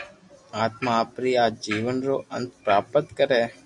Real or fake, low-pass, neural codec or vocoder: real; 10.8 kHz; none